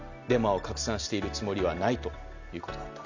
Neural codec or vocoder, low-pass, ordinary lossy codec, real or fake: none; 7.2 kHz; none; real